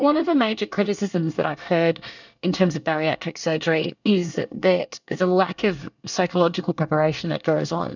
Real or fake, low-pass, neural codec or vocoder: fake; 7.2 kHz; codec, 24 kHz, 1 kbps, SNAC